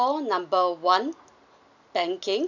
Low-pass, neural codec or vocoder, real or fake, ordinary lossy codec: 7.2 kHz; none; real; none